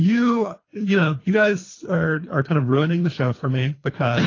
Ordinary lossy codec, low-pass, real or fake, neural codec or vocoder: AAC, 32 kbps; 7.2 kHz; fake; codec, 24 kHz, 3 kbps, HILCodec